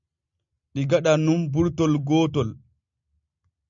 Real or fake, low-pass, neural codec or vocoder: real; 7.2 kHz; none